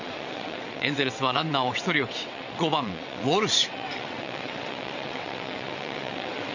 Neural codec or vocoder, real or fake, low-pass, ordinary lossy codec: vocoder, 22.05 kHz, 80 mel bands, WaveNeXt; fake; 7.2 kHz; none